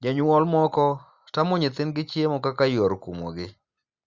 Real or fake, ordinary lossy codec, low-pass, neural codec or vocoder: real; Opus, 64 kbps; 7.2 kHz; none